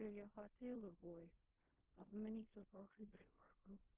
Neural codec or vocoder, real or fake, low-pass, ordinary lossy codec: codec, 16 kHz in and 24 kHz out, 0.4 kbps, LongCat-Audio-Codec, fine tuned four codebook decoder; fake; 3.6 kHz; Opus, 16 kbps